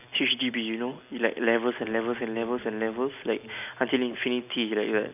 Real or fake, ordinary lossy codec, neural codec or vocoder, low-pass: real; none; none; 3.6 kHz